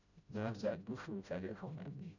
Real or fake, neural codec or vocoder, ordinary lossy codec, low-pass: fake; codec, 16 kHz, 0.5 kbps, FreqCodec, smaller model; none; 7.2 kHz